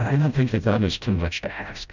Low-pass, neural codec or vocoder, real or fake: 7.2 kHz; codec, 16 kHz, 0.5 kbps, FreqCodec, smaller model; fake